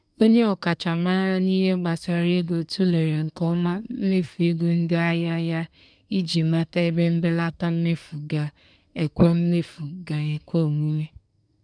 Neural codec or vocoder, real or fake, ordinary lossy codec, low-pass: codec, 24 kHz, 1 kbps, SNAC; fake; none; 9.9 kHz